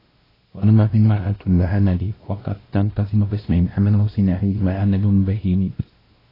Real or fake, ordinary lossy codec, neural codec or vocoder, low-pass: fake; AAC, 24 kbps; codec, 16 kHz, 1 kbps, X-Codec, WavLM features, trained on Multilingual LibriSpeech; 5.4 kHz